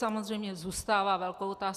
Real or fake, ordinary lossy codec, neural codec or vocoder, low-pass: real; Opus, 64 kbps; none; 14.4 kHz